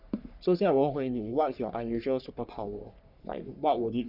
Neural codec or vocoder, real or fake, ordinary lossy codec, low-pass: codec, 44.1 kHz, 3.4 kbps, Pupu-Codec; fake; none; 5.4 kHz